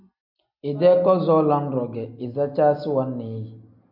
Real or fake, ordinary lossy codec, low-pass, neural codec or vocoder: real; MP3, 48 kbps; 5.4 kHz; none